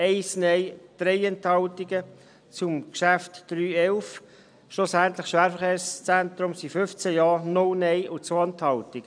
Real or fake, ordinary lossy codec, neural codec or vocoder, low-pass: real; none; none; 9.9 kHz